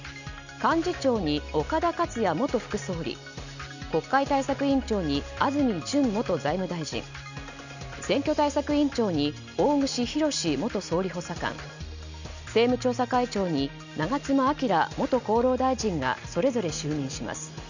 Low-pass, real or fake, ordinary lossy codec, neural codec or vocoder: 7.2 kHz; real; none; none